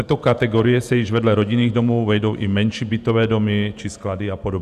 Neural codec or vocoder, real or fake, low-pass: vocoder, 48 kHz, 128 mel bands, Vocos; fake; 14.4 kHz